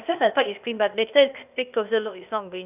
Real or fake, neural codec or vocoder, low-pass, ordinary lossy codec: fake; codec, 16 kHz, 0.8 kbps, ZipCodec; 3.6 kHz; none